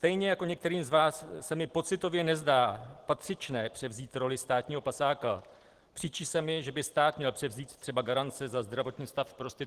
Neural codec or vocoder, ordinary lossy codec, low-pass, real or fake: none; Opus, 16 kbps; 14.4 kHz; real